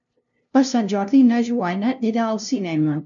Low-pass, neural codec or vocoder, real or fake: 7.2 kHz; codec, 16 kHz, 0.5 kbps, FunCodec, trained on LibriTTS, 25 frames a second; fake